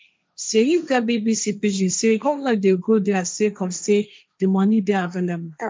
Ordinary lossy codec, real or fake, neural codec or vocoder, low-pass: none; fake; codec, 16 kHz, 1.1 kbps, Voila-Tokenizer; none